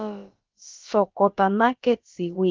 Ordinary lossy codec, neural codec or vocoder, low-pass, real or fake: Opus, 24 kbps; codec, 16 kHz, about 1 kbps, DyCAST, with the encoder's durations; 7.2 kHz; fake